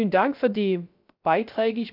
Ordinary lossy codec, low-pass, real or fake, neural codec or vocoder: AAC, 48 kbps; 5.4 kHz; fake; codec, 16 kHz, 0.3 kbps, FocalCodec